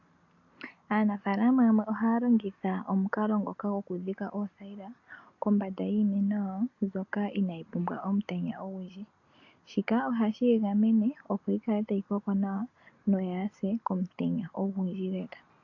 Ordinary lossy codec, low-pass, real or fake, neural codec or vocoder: AAC, 48 kbps; 7.2 kHz; real; none